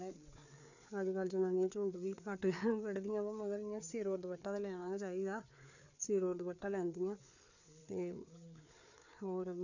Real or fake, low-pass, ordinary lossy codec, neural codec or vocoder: fake; 7.2 kHz; none; codec, 16 kHz, 4 kbps, FreqCodec, larger model